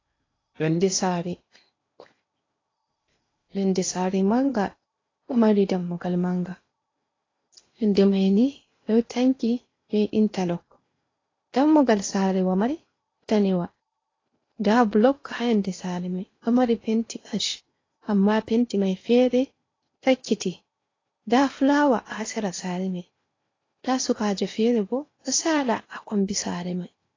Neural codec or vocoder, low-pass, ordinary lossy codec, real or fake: codec, 16 kHz in and 24 kHz out, 0.8 kbps, FocalCodec, streaming, 65536 codes; 7.2 kHz; AAC, 32 kbps; fake